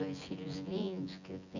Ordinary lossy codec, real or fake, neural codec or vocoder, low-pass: none; fake; vocoder, 24 kHz, 100 mel bands, Vocos; 7.2 kHz